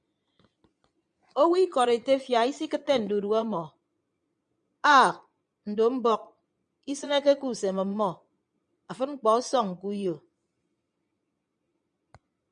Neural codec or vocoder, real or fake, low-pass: vocoder, 22.05 kHz, 80 mel bands, Vocos; fake; 9.9 kHz